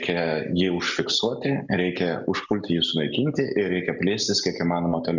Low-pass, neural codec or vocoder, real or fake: 7.2 kHz; none; real